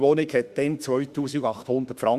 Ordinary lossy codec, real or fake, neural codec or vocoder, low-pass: Opus, 64 kbps; fake; autoencoder, 48 kHz, 32 numbers a frame, DAC-VAE, trained on Japanese speech; 14.4 kHz